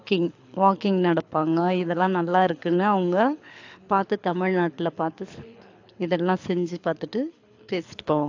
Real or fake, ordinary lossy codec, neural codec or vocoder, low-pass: fake; AAC, 48 kbps; codec, 24 kHz, 6 kbps, HILCodec; 7.2 kHz